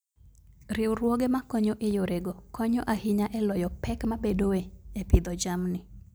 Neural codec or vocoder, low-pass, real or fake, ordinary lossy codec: none; none; real; none